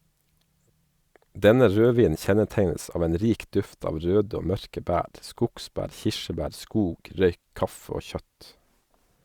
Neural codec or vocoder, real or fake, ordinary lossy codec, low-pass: none; real; Opus, 64 kbps; 19.8 kHz